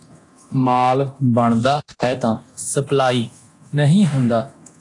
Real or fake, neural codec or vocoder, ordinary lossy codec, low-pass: fake; codec, 24 kHz, 0.9 kbps, DualCodec; AAC, 48 kbps; 10.8 kHz